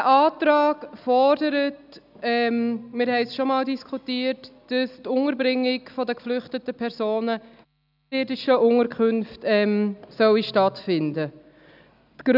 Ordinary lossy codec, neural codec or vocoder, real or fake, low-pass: none; none; real; 5.4 kHz